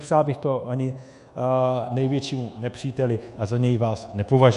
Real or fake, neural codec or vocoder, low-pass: fake; codec, 24 kHz, 1.2 kbps, DualCodec; 10.8 kHz